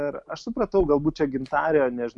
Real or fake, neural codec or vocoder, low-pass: real; none; 10.8 kHz